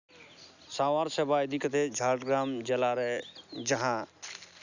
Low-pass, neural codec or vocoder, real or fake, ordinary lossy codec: 7.2 kHz; none; real; none